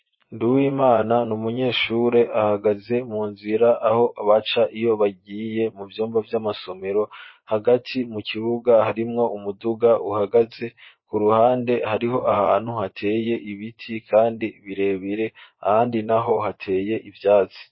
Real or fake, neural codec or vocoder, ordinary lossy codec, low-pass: fake; vocoder, 24 kHz, 100 mel bands, Vocos; MP3, 24 kbps; 7.2 kHz